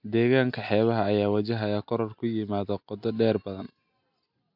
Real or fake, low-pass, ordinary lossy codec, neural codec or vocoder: real; 5.4 kHz; MP3, 48 kbps; none